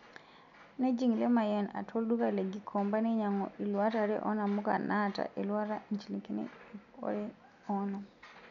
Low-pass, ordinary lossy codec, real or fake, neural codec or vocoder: 7.2 kHz; none; real; none